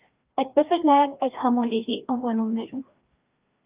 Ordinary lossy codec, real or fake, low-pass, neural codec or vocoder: Opus, 32 kbps; fake; 3.6 kHz; codec, 16 kHz, 1 kbps, FreqCodec, larger model